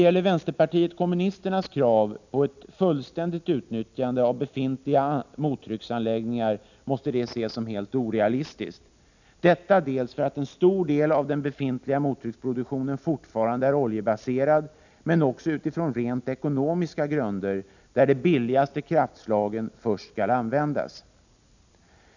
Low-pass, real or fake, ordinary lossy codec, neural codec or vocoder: 7.2 kHz; real; none; none